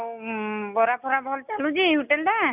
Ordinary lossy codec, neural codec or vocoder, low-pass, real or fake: none; none; 3.6 kHz; real